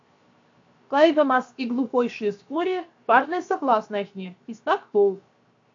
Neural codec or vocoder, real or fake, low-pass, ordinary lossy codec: codec, 16 kHz, 0.7 kbps, FocalCodec; fake; 7.2 kHz; AAC, 48 kbps